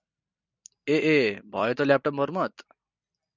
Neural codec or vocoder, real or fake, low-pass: vocoder, 44.1 kHz, 128 mel bands every 256 samples, BigVGAN v2; fake; 7.2 kHz